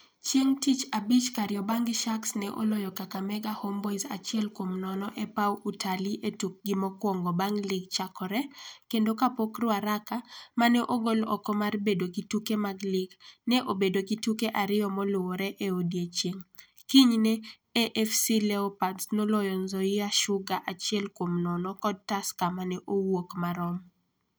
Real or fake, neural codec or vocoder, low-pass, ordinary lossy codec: real; none; none; none